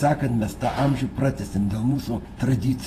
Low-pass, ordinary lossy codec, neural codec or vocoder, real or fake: 14.4 kHz; AAC, 48 kbps; vocoder, 48 kHz, 128 mel bands, Vocos; fake